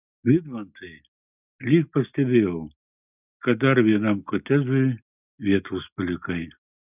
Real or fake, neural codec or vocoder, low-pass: real; none; 3.6 kHz